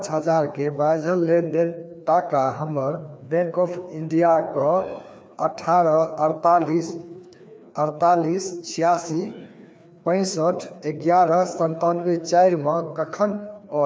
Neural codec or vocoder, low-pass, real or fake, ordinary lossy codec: codec, 16 kHz, 2 kbps, FreqCodec, larger model; none; fake; none